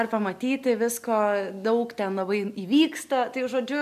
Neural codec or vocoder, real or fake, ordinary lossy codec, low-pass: none; real; AAC, 96 kbps; 14.4 kHz